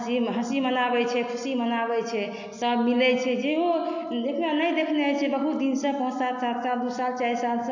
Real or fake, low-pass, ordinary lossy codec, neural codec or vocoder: real; 7.2 kHz; none; none